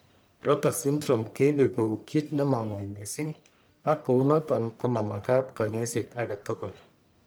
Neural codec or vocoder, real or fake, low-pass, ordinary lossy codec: codec, 44.1 kHz, 1.7 kbps, Pupu-Codec; fake; none; none